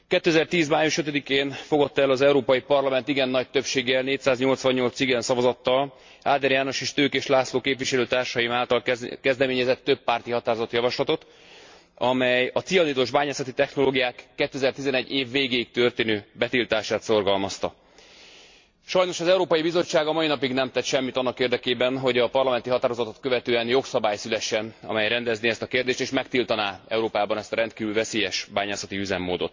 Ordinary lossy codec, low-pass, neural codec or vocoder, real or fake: MP3, 48 kbps; 7.2 kHz; none; real